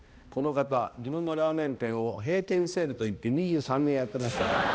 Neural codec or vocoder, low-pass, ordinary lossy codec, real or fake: codec, 16 kHz, 1 kbps, X-Codec, HuBERT features, trained on balanced general audio; none; none; fake